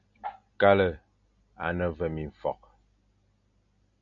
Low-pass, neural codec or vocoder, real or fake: 7.2 kHz; none; real